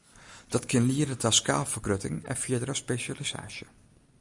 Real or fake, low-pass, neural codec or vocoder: real; 10.8 kHz; none